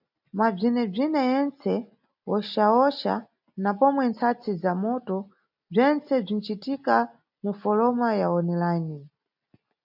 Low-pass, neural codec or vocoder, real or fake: 5.4 kHz; none; real